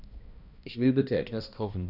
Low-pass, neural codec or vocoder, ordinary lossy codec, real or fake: 5.4 kHz; codec, 16 kHz, 1 kbps, X-Codec, HuBERT features, trained on balanced general audio; none; fake